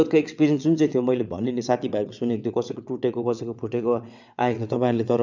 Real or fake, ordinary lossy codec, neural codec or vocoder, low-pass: fake; none; vocoder, 22.05 kHz, 80 mel bands, WaveNeXt; 7.2 kHz